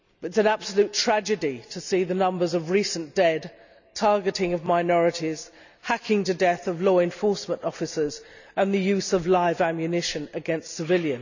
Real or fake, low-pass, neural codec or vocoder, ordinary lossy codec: real; 7.2 kHz; none; none